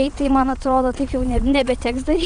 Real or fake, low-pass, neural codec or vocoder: fake; 9.9 kHz; vocoder, 22.05 kHz, 80 mel bands, Vocos